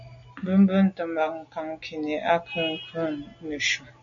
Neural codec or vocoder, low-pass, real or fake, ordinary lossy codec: none; 7.2 kHz; real; MP3, 64 kbps